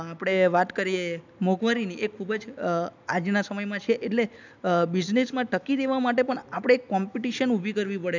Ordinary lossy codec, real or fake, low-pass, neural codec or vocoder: none; real; 7.2 kHz; none